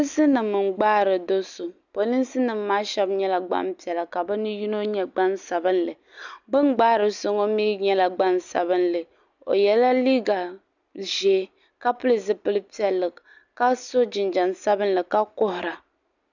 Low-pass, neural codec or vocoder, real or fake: 7.2 kHz; none; real